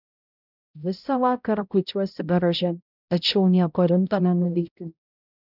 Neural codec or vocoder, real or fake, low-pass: codec, 16 kHz, 0.5 kbps, X-Codec, HuBERT features, trained on balanced general audio; fake; 5.4 kHz